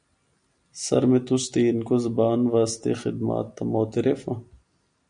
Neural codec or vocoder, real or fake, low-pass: none; real; 9.9 kHz